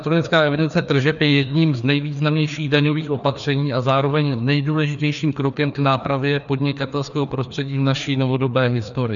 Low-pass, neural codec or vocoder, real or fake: 7.2 kHz; codec, 16 kHz, 2 kbps, FreqCodec, larger model; fake